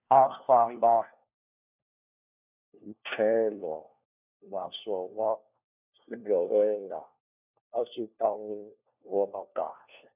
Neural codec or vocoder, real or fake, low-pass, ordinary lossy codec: codec, 16 kHz, 1 kbps, FunCodec, trained on LibriTTS, 50 frames a second; fake; 3.6 kHz; none